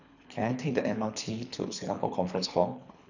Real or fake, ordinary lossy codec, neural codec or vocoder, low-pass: fake; none; codec, 24 kHz, 3 kbps, HILCodec; 7.2 kHz